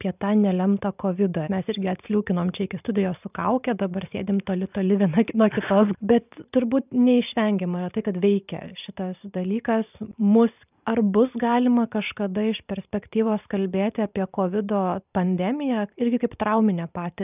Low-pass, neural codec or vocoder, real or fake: 3.6 kHz; vocoder, 44.1 kHz, 128 mel bands every 512 samples, BigVGAN v2; fake